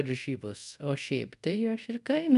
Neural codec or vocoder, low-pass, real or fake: codec, 24 kHz, 0.5 kbps, DualCodec; 10.8 kHz; fake